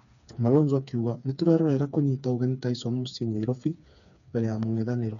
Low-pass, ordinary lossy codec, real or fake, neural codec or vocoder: 7.2 kHz; none; fake; codec, 16 kHz, 4 kbps, FreqCodec, smaller model